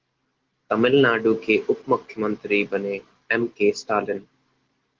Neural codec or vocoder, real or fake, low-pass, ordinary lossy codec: none; real; 7.2 kHz; Opus, 16 kbps